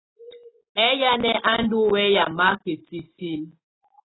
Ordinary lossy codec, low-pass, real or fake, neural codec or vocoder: AAC, 16 kbps; 7.2 kHz; real; none